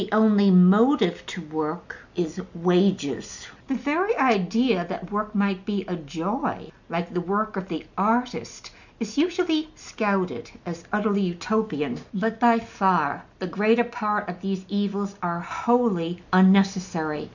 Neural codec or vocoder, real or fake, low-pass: none; real; 7.2 kHz